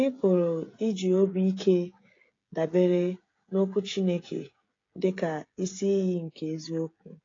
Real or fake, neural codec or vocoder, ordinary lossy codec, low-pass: fake; codec, 16 kHz, 16 kbps, FreqCodec, smaller model; AAC, 32 kbps; 7.2 kHz